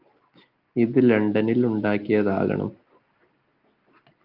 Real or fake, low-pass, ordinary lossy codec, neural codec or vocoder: real; 5.4 kHz; Opus, 32 kbps; none